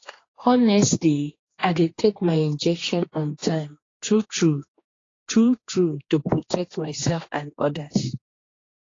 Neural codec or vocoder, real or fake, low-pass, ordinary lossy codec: codec, 16 kHz, 2 kbps, X-Codec, HuBERT features, trained on general audio; fake; 7.2 kHz; AAC, 32 kbps